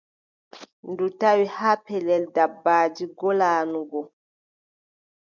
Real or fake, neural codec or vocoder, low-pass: real; none; 7.2 kHz